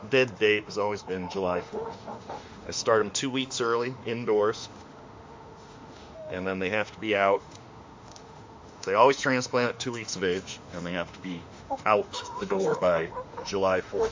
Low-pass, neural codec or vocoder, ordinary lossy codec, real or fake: 7.2 kHz; autoencoder, 48 kHz, 32 numbers a frame, DAC-VAE, trained on Japanese speech; MP3, 48 kbps; fake